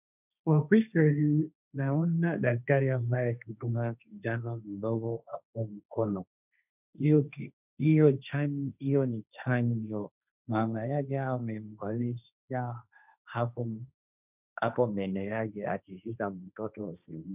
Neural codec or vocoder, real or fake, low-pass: codec, 16 kHz, 1.1 kbps, Voila-Tokenizer; fake; 3.6 kHz